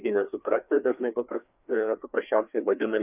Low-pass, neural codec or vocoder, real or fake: 3.6 kHz; codec, 16 kHz, 2 kbps, FreqCodec, larger model; fake